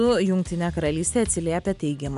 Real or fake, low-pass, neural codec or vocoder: real; 10.8 kHz; none